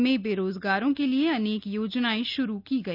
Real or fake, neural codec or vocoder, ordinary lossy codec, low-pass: real; none; none; 5.4 kHz